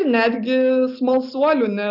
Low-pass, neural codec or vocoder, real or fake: 5.4 kHz; none; real